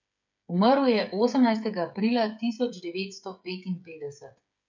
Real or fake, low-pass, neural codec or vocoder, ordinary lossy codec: fake; 7.2 kHz; codec, 16 kHz, 8 kbps, FreqCodec, smaller model; none